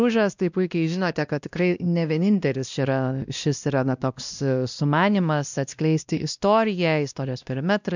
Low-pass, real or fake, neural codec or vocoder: 7.2 kHz; fake; codec, 16 kHz, 1 kbps, X-Codec, WavLM features, trained on Multilingual LibriSpeech